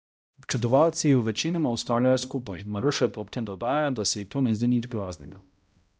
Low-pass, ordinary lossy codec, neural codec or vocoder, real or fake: none; none; codec, 16 kHz, 0.5 kbps, X-Codec, HuBERT features, trained on balanced general audio; fake